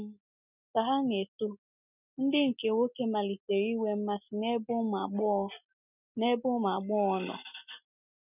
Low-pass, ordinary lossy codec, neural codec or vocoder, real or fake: 3.6 kHz; none; none; real